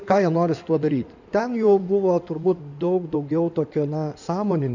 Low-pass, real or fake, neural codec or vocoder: 7.2 kHz; fake; codec, 16 kHz in and 24 kHz out, 2.2 kbps, FireRedTTS-2 codec